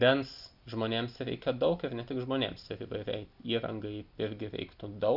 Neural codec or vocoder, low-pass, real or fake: none; 5.4 kHz; real